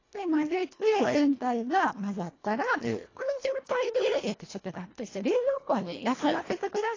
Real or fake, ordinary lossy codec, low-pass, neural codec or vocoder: fake; AAC, 32 kbps; 7.2 kHz; codec, 24 kHz, 1.5 kbps, HILCodec